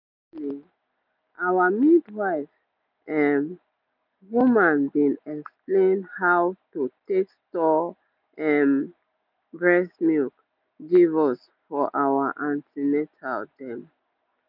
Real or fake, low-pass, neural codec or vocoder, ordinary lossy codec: real; 5.4 kHz; none; none